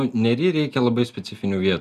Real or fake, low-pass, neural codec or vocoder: real; 14.4 kHz; none